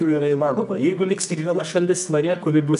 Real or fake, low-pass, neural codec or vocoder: fake; 10.8 kHz; codec, 24 kHz, 0.9 kbps, WavTokenizer, medium music audio release